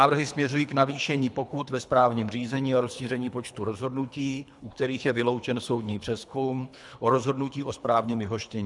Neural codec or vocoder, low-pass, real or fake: codec, 24 kHz, 3 kbps, HILCodec; 10.8 kHz; fake